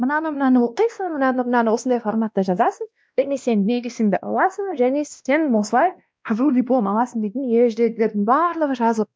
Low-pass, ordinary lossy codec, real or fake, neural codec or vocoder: none; none; fake; codec, 16 kHz, 1 kbps, X-Codec, WavLM features, trained on Multilingual LibriSpeech